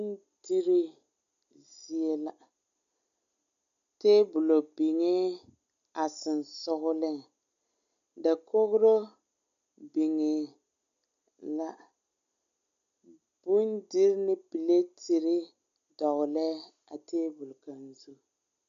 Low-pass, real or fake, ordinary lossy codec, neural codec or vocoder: 7.2 kHz; real; MP3, 64 kbps; none